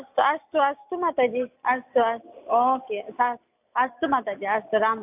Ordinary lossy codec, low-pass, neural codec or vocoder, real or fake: AAC, 32 kbps; 3.6 kHz; none; real